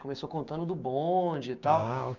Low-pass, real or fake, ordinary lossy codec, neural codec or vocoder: 7.2 kHz; fake; none; codec, 16 kHz, 6 kbps, DAC